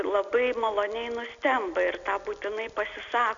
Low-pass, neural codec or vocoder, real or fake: 7.2 kHz; none; real